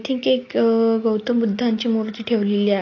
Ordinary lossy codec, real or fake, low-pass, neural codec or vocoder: AAC, 32 kbps; real; 7.2 kHz; none